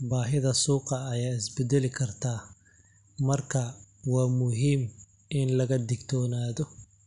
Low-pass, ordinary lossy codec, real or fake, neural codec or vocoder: 10.8 kHz; none; real; none